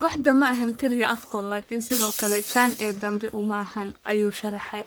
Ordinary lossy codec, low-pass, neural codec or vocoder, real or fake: none; none; codec, 44.1 kHz, 1.7 kbps, Pupu-Codec; fake